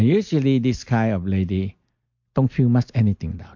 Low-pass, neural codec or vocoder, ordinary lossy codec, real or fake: 7.2 kHz; none; MP3, 48 kbps; real